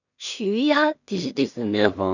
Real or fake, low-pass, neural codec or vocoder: fake; 7.2 kHz; codec, 16 kHz in and 24 kHz out, 0.4 kbps, LongCat-Audio-Codec, two codebook decoder